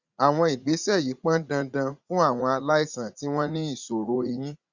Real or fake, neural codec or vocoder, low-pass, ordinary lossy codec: fake; vocoder, 44.1 kHz, 80 mel bands, Vocos; 7.2 kHz; Opus, 64 kbps